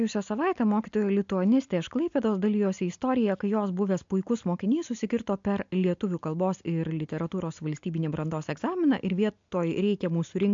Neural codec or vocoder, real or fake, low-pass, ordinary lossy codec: none; real; 7.2 kHz; AAC, 64 kbps